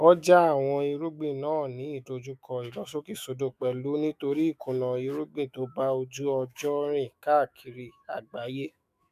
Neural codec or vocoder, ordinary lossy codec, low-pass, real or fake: autoencoder, 48 kHz, 128 numbers a frame, DAC-VAE, trained on Japanese speech; none; 14.4 kHz; fake